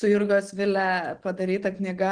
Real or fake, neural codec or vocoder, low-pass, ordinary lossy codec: real; none; 9.9 kHz; Opus, 16 kbps